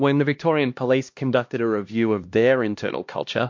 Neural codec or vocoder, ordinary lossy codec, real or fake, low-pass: codec, 16 kHz, 1 kbps, X-Codec, HuBERT features, trained on LibriSpeech; MP3, 48 kbps; fake; 7.2 kHz